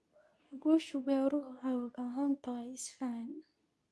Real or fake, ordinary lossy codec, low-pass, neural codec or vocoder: fake; none; none; codec, 24 kHz, 0.9 kbps, WavTokenizer, medium speech release version 2